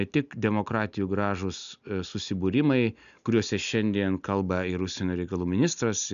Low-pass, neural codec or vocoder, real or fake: 7.2 kHz; none; real